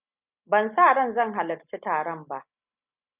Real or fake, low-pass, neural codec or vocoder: real; 3.6 kHz; none